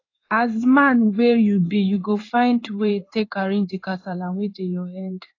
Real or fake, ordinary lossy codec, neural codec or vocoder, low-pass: fake; AAC, 32 kbps; codec, 16 kHz in and 24 kHz out, 1 kbps, XY-Tokenizer; 7.2 kHz